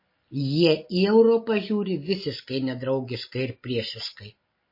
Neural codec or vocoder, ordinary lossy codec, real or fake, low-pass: none; MP3, 24 kbps; real; 5.4 kHz